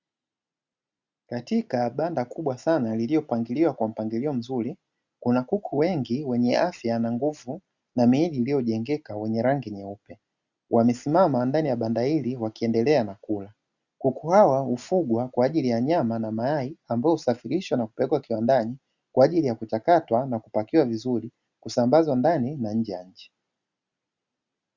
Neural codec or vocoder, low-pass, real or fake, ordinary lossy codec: none; 7.2 kHz; real; Opus, 64 kbps